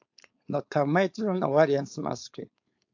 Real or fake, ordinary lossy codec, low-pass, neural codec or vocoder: fake; AAC, 48 kbps; 7.2 kHz; codec, 16 kHz, 4.8 kbps, FACodec